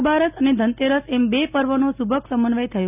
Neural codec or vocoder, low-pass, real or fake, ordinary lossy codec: none; 3.6 kHz; real; none